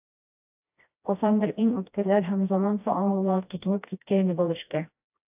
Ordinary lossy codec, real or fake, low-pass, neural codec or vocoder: AAC, 32 kbps; fake; 3.6 kHz; codec, 16 kHz, 1 kbps, FreqCodec, smaller model